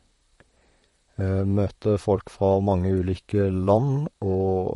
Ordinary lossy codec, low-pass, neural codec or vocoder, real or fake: MP3, 48 kbps; 19.8 kHz; vocoder, 44.1 kHz, 128 mel bands, Pupu-Vocoder; fake